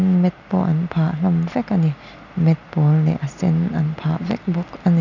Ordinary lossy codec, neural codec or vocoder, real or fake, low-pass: none; none; real; 7.2 kHz